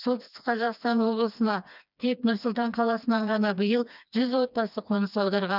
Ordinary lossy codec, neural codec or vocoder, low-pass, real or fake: none; codec, 16 kHz, 2 kbps, FreqCodec, smaller model; 5.4 kHz; fake